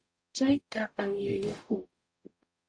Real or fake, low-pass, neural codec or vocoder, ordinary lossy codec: fake; 9.9 kHz; codec, 44.1 kHz, 0.9 kbps, DAC; AAC, 48 kbps